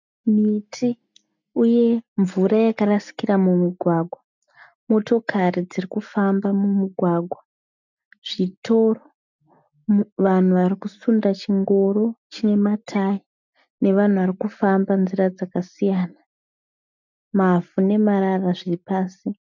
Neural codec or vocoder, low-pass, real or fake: none; 7.2 kHz; real